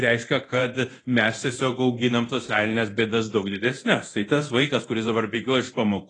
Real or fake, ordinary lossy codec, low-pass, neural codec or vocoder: fake; AAC, 32 kbps; 10.8 kHz; codec, 24 kHz, 0.9 kbps, DualCodec